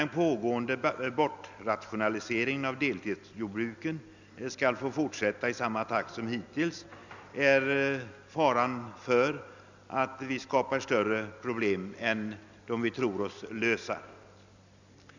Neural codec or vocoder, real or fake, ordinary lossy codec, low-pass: none; real; none; 7.2 kHz